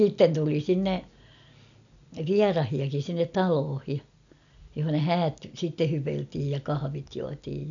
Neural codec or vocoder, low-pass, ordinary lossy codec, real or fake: none; 7.2 kHz; none; real